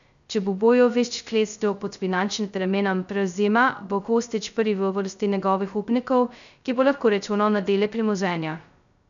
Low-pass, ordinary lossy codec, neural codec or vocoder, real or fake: 7.2 kHz; none; codec, 16 kHz, 0.2 kbps, FocalCodec; fake